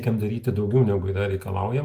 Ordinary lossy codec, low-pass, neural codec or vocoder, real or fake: Opus, 32 kbps; 14.4 kHz; none; real